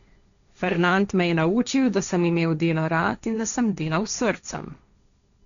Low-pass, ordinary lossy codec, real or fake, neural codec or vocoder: 7.2 kHz; none; fake; codec, 16 kHz, 1.1 kbps, Voila-Tokenizer